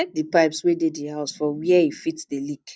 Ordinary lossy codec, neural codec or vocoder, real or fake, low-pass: none; none; real; none